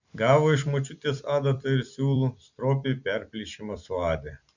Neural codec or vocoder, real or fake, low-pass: vocoder, 44.1 kHz, 128 mel bands every 256 samples, BigVGAN v2; fake; 7.2 kHz